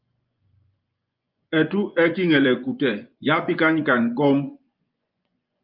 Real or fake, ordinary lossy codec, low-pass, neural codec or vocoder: fake; Opus, 24 kbps; 5.4 kHz; vocoder, 24 kHz, 100 mel bands, Vocos